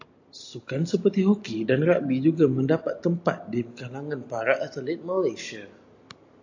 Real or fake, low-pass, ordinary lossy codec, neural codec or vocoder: real; 7.2 kHz; AAC, 48 kbps; none